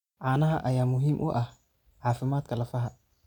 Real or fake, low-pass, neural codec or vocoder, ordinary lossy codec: real; 19.8 kHz; none; none